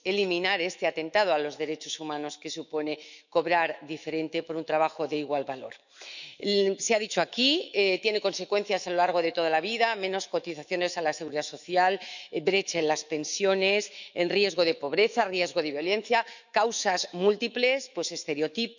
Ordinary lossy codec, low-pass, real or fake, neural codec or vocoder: none; 7.2 kHz; fake; autoencoder, 48 kHz, 128 numbers a frame, DAC-VAE, trained on Japanese speech